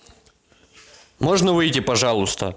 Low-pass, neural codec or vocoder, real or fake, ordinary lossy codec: none; none; real; none